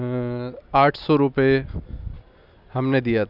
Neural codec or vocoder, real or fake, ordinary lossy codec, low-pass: none; real; none; 5.4 kHz